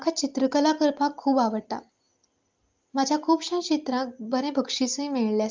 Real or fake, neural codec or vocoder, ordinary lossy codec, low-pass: real; none; Opus, 32 kbps; 7.2 kHz